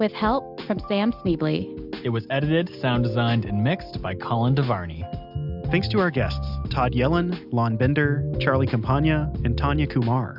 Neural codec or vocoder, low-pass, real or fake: none; 5.4 kHz; real